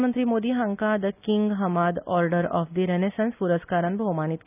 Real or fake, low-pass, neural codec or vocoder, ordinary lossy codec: real; 3.6 kHz; none; none